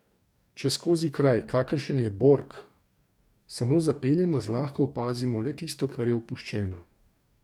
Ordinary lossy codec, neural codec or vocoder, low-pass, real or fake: none; codec, 44.1 kHz, 2.6 kbps, DAC; 19.8 kHz; fake